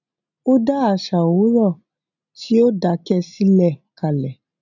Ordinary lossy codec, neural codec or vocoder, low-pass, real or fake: none; none; 7.2 kHz; real